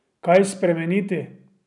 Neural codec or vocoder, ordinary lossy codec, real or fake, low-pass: none; none; real; 10.8 kHz